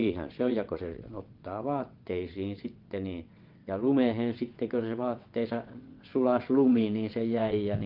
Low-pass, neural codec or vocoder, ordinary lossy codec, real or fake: 5.4 kHz; vocoder, 22.05 kHz, 80 mel bands, WaveNeXt; Opus, 32 kbps; fake